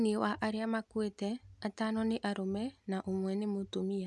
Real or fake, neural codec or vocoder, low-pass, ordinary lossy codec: real; none; none; none